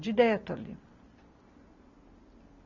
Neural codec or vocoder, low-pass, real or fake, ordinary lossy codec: none; 7.2 kHz; real; none